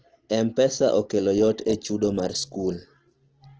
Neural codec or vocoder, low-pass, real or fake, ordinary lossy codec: none; 7.2 kHz; real; Opus, 16 kbps